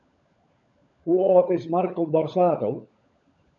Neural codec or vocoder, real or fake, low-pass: codec, 16 kHz, 16 kbps, FunCodec, trained on LibriTTS, 50 frames a second; fake; 7.2 kHz